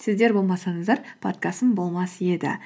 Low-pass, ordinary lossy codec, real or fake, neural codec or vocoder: none; none; real; none